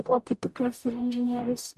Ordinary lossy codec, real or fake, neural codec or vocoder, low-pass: MP3, 96 kbps; fake; codec, 44.1 kHz, 0.9 kbps, DAC; 14.4 kHz